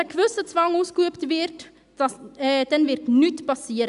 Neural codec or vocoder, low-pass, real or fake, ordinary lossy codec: none; 10.8 kHz; real; none